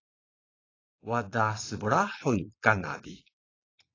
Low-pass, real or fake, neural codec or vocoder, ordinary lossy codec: 7.2 kHz; fake; vocoder, 22.05 kHz, 80 mel bands, Vocos; AAC, 32 kbps